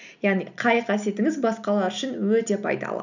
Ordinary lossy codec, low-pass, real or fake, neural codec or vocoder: none; 7.2 kHz; real; none